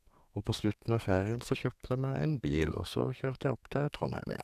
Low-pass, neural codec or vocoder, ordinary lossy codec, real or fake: 14.4 kHz; codec, 32 kHz, 1.9 kbps, SNAC; none; fake